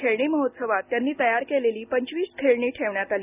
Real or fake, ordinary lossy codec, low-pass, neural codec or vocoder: real; none; 3.6 kHz; none